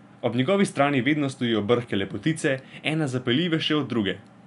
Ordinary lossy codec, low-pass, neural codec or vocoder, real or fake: none; 10.8 kHz; none; real